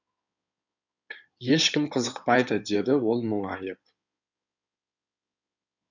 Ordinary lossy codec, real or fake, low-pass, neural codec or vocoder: none; fake; 7.2 kHz; codec, 16 kHz in and 24 kHz out, 2.2 kbps, FireRedTTS-2 codec